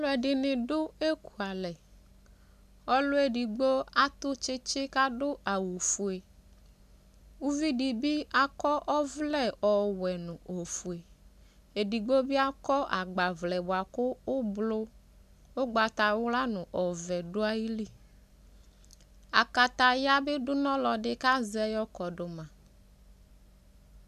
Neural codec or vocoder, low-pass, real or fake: none; 14.4 kHz; real